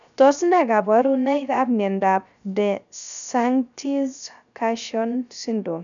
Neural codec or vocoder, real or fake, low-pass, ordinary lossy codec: codec, 16 kHz, 0.3 kbps, FocalCodec; fake; 7.2 kHz; none